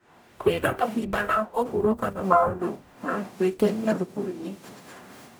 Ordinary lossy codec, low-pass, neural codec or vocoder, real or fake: none; none; codec, 44.1 kHz, 0.9 kbps, DAC; fake